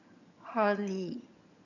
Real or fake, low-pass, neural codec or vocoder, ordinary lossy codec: fake; 7.2 kHz; vocoder, 22.05 kHz, 80 mel bands, HiFi-GAN; none